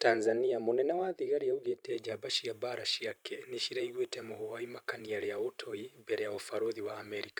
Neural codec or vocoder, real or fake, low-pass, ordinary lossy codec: vocoder, 44.1 kHz, 128 mel bands every 512 samples, BigVGAN v2; fake; none; none